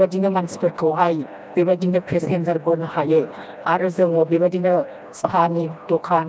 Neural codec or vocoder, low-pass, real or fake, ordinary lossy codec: codec, 16 kHz, 1 kbps, FreqCodec, smaller model; none; fake; none